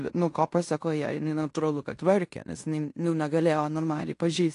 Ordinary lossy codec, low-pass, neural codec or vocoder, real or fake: AAC, 48 kbps; 10.8 kHz; codec, 16 kHz in and 24 kHz out, 0.9 kbps, LongCat-Audio-Codec, fine tuned four codebook decoder; fake